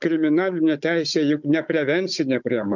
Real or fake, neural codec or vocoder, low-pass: fake; codec, 16 kHz, 16 kbps, FunCodec, trained on Chinese and English, 50 frames a second; 7.2 kHz